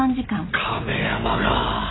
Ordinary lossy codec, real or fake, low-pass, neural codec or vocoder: AAC, 16 kbps; real; 7.2 kHz; none